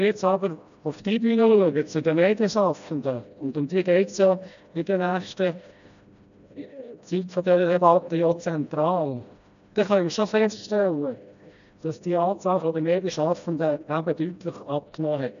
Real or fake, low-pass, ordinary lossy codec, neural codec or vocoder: fake; 7.2 kHz; none; codec, 16 kHz, 1 kbps, FreqCodec, smaller model